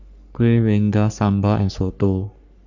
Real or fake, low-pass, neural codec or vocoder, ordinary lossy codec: fake; 7.2 kHz; codec, 44.1 kHz, 3.4 kbps, Pupu-Codec; none